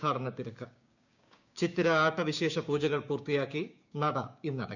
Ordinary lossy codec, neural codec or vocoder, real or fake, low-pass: none; codec, 16 kHz, 6 kbps, DAC; fake; 7.2 kHz